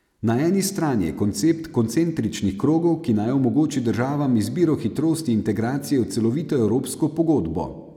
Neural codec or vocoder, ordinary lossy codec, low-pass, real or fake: none; none; 19.8 kHz; real